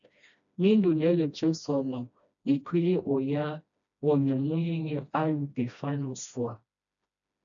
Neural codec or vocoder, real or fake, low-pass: codec, 16 kHz, 1 kbps, FreqCodec, smaller model; fake; 7.2 kHz